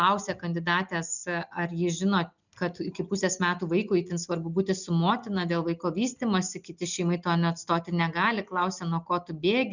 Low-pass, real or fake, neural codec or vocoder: 7.2 kHz; real; none